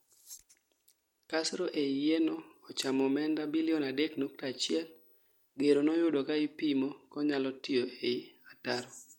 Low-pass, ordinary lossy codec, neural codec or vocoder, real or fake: 19.8 kHz; MP3, 64 kbps; none; real